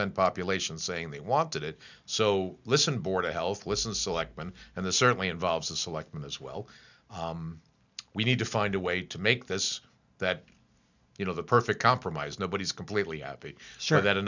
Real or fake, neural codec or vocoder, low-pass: real; none; 7.2 kHz